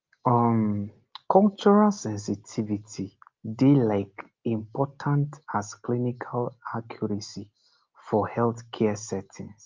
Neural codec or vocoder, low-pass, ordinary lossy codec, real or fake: none; 7.2 kHz; Opus, 24 kbps; real